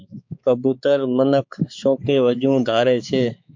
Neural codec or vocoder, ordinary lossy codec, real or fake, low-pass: codec, 16 kHz, 4 kbps, X-Codec, HuBERT features, trained on balanced general audio; MP3, 48 kbps; fake; 7.2 kHz